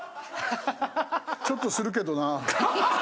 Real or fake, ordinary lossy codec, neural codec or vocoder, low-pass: real; none; none; none